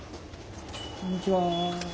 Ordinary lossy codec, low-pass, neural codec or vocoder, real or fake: none; none; none; real